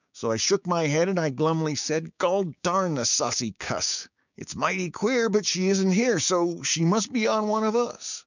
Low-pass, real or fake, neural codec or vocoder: 7.2 kHz; fake; codec, 16 kHz, 6 kbps, DAC